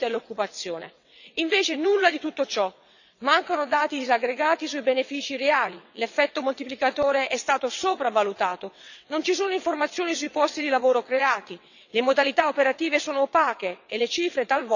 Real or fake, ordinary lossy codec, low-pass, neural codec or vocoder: fake; none; 7.2 kHz; vocoder, 22.05 kHz, 80 mel bands, WaveNeXt